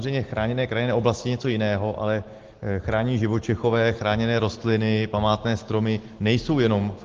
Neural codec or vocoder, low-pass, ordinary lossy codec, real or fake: none; 7.2 kHz; Opus, 16 kbps; real